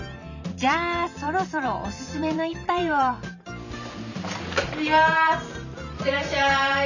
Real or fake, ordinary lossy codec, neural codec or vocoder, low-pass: fake; none; vocoder, 44.1 kHz, 128 mel bands every 512 samples, BigVGAN v2; 7.2 kHz